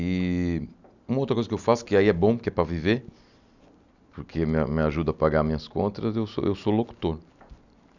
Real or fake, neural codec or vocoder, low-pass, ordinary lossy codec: real; none; 7.2 kHz; none